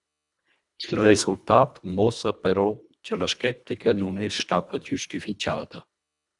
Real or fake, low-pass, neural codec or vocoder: fake; 10.8 kHz; codec, 24 kHz, 1.5 kbps, HILCodec